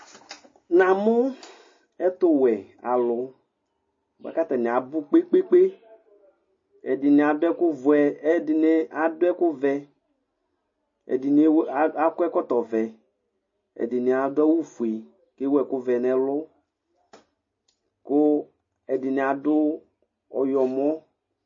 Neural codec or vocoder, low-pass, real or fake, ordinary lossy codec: none; 7.2 kHz; real; MP3, 32 kbps